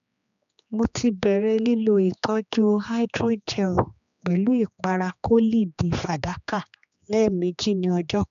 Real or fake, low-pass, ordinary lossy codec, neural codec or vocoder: fake; 7.2 kHz; MP3, 96 kbps; codec, 16 kHz, 2 kbps, X-Codec, HuBERT features, trained on general audio